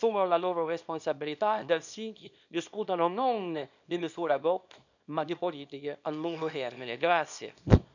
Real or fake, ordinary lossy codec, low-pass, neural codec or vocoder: fake; none; 7.2 kHz; codec, 24 kHz, 0.9 kbps, WavTokenizer, small release